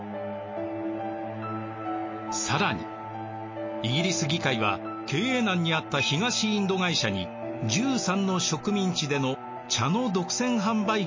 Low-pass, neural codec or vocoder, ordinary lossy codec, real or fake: 7.2 kHz; none; MP3, 32 kbps; real